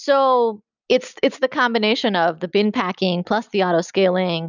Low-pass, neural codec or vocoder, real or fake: 7.2 kHz; none; real